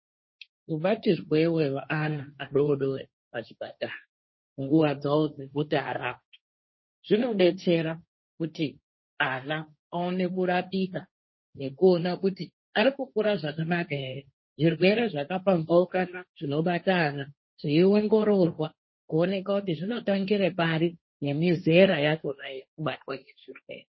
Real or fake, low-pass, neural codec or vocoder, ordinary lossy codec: fake; 7.2 kHz; codec, 16 kHz, 1.1 kbps, Voila-Tokenizer; MP3, 24 kbps